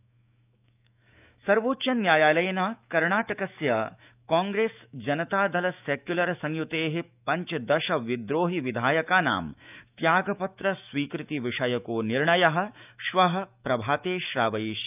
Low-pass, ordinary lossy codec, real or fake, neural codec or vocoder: 3.6 kHz; none; fake; autoencoder, 48 kHz, 128 numbers a frame, DAC-VAE, trained on Japanese speech